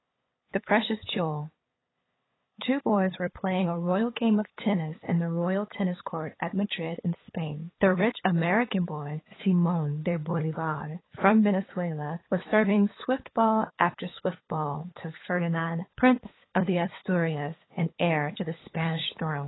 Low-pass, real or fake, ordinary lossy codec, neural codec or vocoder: 7.2 kHz; fake; AAC, 16 kbps; codec, 16 kHz, 8 kbps, FunCodec, trained on LibriTTS, 25 frames a second